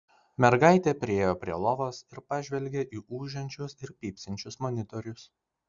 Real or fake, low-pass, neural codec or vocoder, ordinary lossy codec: real; 7.2 kHz; none; Opus, 64 kbps